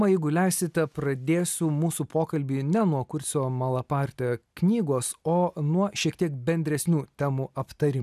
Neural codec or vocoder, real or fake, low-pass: none; real; 14.4 kHz